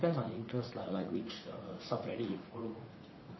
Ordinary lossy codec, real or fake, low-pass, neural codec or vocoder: MP3, 24 kbps; fake; 7.2 kHz; vocoder, 44.1 kHz, 80 mel bands, Vocos